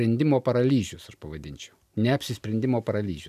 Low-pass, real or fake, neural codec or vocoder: 14.4 kHz; real; none